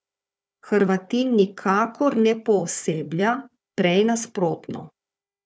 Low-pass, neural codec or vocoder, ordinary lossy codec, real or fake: none; codec, 16 kHz, 4 kbps, FunCodec, trained on Chinese and English, 50 frames a second; none; fake